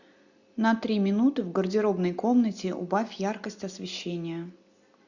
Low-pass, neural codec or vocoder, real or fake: 7.2 kHz; none; real